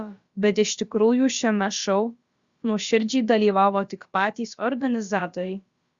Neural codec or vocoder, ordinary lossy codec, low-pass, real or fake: codec, 16 kHz, about 1 kbps, DyCAST, with the encoder's durations; Opus, 64 kbps; 7.2 kHz; fake